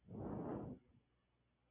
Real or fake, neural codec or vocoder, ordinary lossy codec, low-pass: real; none; MP3, 32 kbps; 3.6 kHz